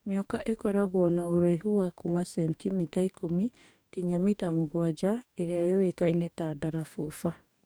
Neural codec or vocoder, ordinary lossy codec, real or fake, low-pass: codec, 44.1 kHz, 2.6 kbps, DAC; none; fake; none